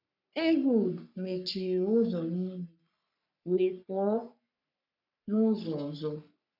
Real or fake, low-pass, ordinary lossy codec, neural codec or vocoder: fake; 5.4 kHz; none; codec, 44.1 kHz, 3.4 kbps, Pupu-Codec